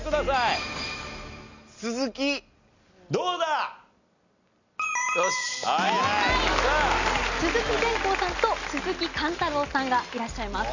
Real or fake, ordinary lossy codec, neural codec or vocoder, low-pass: real; none; none; 7.2 kHz